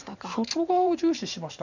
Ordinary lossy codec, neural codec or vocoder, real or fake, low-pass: none; vocoder, 44.1 kHz, 128 mel bands every 512 samples, BigVGAN v2; fake; 7.2 kHz